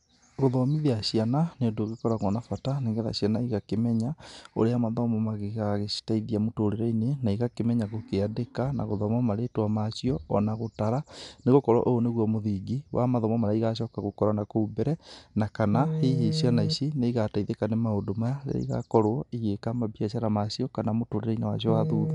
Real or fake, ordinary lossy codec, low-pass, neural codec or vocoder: real; MP3, 96 kbps; 10.8 kHz; none